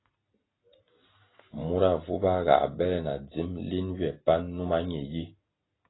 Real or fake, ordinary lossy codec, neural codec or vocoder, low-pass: real; AAC, 16 kbps; none; 7.2 kHz